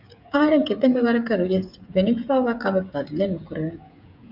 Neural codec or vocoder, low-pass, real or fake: codec, 16 kHz, 16 kbps, FreqCodec, smaller model; 5.4 kHz; fake